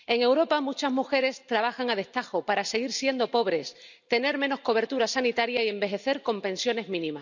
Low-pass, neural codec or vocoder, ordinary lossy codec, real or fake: 7.2 kHz; none; none; real